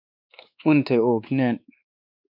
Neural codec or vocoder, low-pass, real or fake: codec, 16 kHz, 2 kbps, X-Codec, WavLM features, trained on Multilingual LibriSpeech; 5.4 kHz; fake